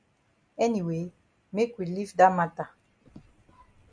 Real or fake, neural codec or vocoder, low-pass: real; none; 9.9 kHz